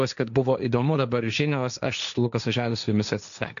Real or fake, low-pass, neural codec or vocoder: fake; 7.2 kHz; codec, 16 kHz, 1.1 kbps, Voila-Tokenizer